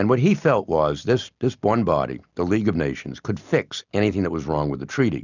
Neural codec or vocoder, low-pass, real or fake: none; 7.2 kHz; real